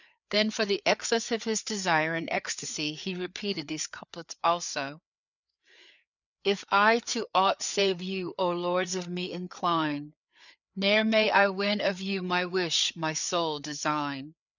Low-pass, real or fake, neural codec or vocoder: 7.2 kHz; fake; codec, 16 kHz, 4 kbps, FreqCodec, larger model